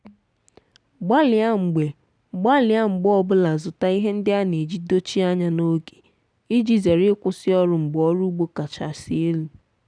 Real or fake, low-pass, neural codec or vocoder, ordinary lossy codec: real; 9.9 kHz; none; none